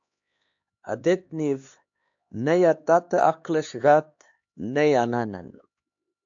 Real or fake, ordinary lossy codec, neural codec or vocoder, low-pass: fake; AAC, 64 kbps; codec, 16 kHz, 2 kbps, X-Codec, HuBERT features, trained on LibriSpeech; 7.2 kHz